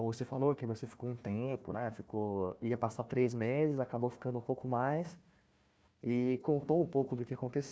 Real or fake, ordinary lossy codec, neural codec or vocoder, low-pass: fake; none; codec, 16 kHz, 1 kbps, FunCodec, trained on Chinese and English, 50 frames a second; none